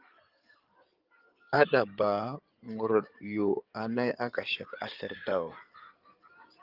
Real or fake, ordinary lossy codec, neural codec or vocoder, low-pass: fake; Opus, 24 kbps; codec, 16 kHz in and 24 kHz out, 2.2 kbps, FireRedTTS-2 codec; 5.4 kHz